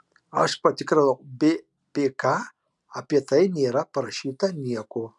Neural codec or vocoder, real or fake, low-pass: none; real; 10.8 kHz